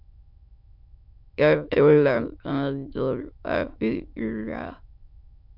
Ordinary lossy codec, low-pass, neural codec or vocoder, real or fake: MP3, 48 kbps; 5.4 kHz; autoencoder, 22.05 kHz, a latent of 192 numbers a frame, VITS, trained on many speakers; fake